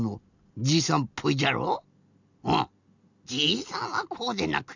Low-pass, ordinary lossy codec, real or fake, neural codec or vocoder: 7.2 kHz; none; real; none